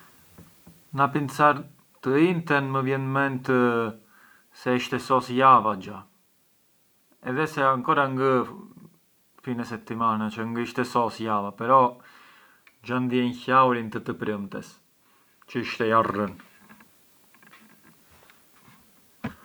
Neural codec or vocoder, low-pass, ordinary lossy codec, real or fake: none; none; none; real